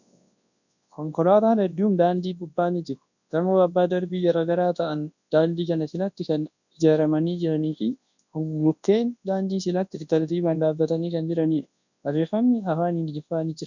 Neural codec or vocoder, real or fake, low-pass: codec, 24 kHz, 0.9 kbps, WavTokenizer, large speech release; fake; 7.2 kHz